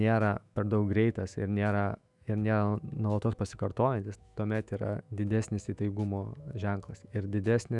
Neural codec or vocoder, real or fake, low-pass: autoencoder, 48 kHz, 128 numbers a frame, DAC-VAE, trained on Japanese speech; fake; 10.8 kHz